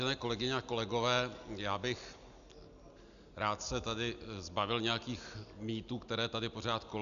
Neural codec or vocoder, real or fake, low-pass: none; real; 7.2 kHz